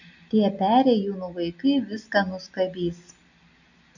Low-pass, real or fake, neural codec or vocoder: 7.2 kHz; real; none